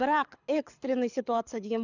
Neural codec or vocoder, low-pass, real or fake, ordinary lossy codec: codec, 24 kHz, 6 kbps, HILCodec; 7.2 kHz; fake; none